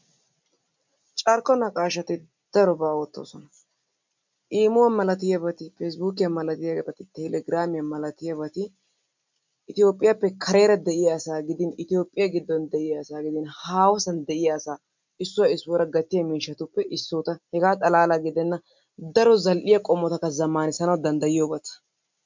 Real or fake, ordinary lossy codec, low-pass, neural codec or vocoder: real; MP3, 64 kbps; 7.2 kHz; none